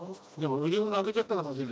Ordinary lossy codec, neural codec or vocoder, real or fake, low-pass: none; codec, 16 kHz, 1 kbps, FreqCodec, smaller model; fake; none